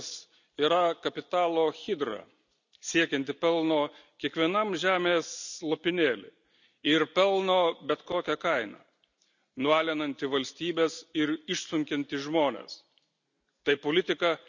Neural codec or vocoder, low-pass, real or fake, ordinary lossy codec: none; 7.2 kHz; real; none